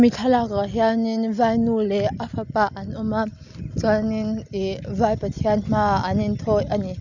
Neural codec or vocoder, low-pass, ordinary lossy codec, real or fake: none; 7.2 kHz; none; real